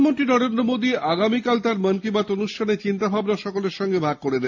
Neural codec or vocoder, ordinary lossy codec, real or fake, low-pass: none; AAC, 48 kbps; real; 7.2 kHz